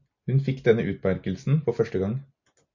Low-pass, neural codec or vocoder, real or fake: 7.2 kHz; none; real